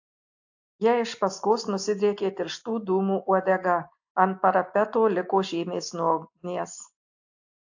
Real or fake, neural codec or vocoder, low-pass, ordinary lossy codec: real; none; 7.2 kHz; AAC, 48 kbps